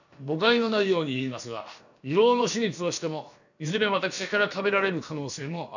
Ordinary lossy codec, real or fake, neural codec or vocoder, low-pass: none; fake; codec, 16 kHz, about 1 kbps, DyCAST, with the encoder's durations; 7.2 kHz